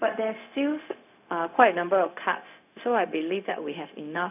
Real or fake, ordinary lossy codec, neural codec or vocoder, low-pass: fake; none; codec, 16 kHz, 0.4 kbps, LongCat-Audio-Codec; 3.6 kHz